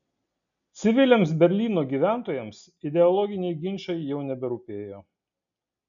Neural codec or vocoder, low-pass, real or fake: none; 7.2 kHz; real